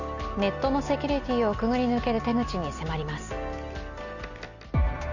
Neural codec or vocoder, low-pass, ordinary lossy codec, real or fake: none; 7.2 kHz; none; real